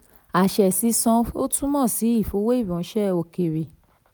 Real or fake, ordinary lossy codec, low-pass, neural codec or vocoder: real; none; none; none